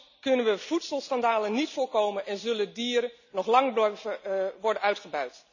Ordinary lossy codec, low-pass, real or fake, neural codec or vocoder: none; 7.2 kHz; real; none